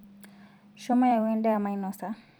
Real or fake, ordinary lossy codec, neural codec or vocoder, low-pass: fake; none; vocoder, 44.1 kHz, 128 mel bands every 256 samples, BigVGAN v2; none